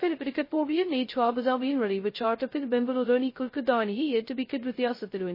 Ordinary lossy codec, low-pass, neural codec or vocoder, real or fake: MP3, 24 kbps; 5.4 kHz; codec, 16 kHz, 0.2 kbps, FocalCodec; fake